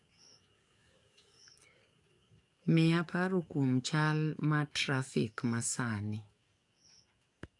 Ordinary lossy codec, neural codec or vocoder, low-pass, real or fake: AAC, 64 kbps; codec, 44.1 kHz, 7.8 kbps, DAC; 10.8 kHz; fake